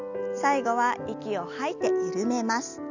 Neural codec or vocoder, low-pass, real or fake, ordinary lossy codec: none; 7.2 kHz; real; none